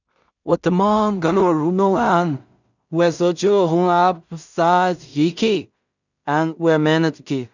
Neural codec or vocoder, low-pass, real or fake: codec, 16 kHz in and 24 kHz out, 0.4 kbps, LongCat-Audio-Codec, two codebook decoder; 7.2 kHz; fake